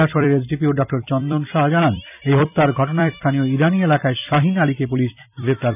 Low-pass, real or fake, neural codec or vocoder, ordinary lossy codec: 3.6 kHz; fake; vocoder, 44.1 kHz, 128 mel bands every 256 samples, BigVGAN v2; none